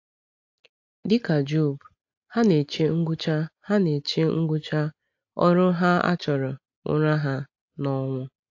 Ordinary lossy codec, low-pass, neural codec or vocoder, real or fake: AAC, 48 kbps; 7.2 kHz; none; real